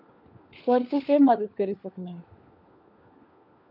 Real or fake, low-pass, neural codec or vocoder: fake; 5.4 kHz; codec, 16 kHz, 4 kbps, FunCodec, trained on LibriTTS, 50 frames a second